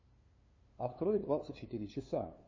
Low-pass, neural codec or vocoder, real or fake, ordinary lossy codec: 7.2 kHz; codec, 16 kHz, 2 kbps, FunCodec, trained on LibriTTS, 25 frames a second; fake; MP3, 32 kbps